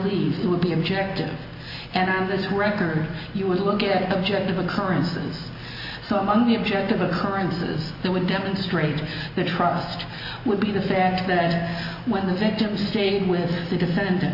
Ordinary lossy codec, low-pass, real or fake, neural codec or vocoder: Opus, 64 kbps; 5.4 kHz; real; none